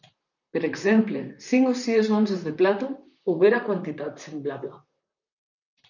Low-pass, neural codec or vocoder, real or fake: 7.2 kHz; vocoder, 44.1 kHz, 128 mel bands, Pupu-Vocoder; fake